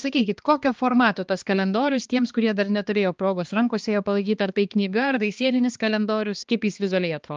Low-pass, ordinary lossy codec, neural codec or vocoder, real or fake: 7.2 kHz; Opus, 24 kbps; codec, 16 kHz, 2 kbps, X-Codec, HuBERT features, trained on balanced general audio; fake